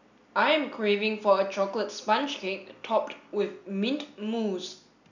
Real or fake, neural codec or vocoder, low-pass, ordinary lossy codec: real; none; 7.2 kHz; none